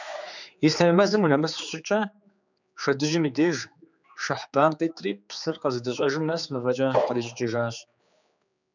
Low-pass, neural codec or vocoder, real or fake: 7.2 kHz; codec, 16 kHz, 4 kbps, X-Codec, HuBERT features, trained on general audio; fake